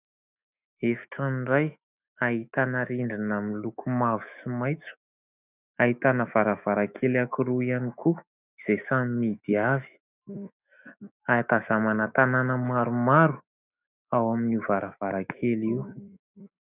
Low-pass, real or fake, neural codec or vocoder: 3.6 kHz; fake; autoencoder, 48 kHz, 128 numbers a frame, DAC-VAE, trained on Japanese speech